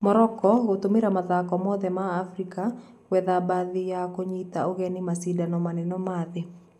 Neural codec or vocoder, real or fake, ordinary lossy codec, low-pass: none; real; MP3, 96 kbps; 14.4 kHz